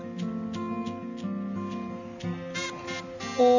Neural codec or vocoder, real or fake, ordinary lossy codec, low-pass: none; real; none; 7.2 kHz